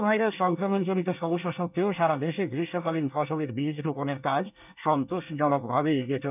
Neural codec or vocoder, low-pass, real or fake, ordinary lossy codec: codec, 24 kHz, 1 kbps, SNAC; 3.6 kHz; fake; none